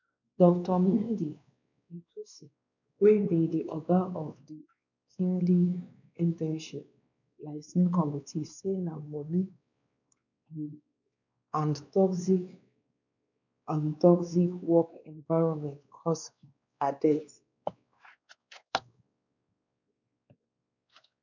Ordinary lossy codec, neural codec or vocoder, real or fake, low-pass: none; codec, 16 kHz, 2 kbps, X-Codec, WavLM features, trained on Multilingual LibriSpeech; fake; 7.2 kHz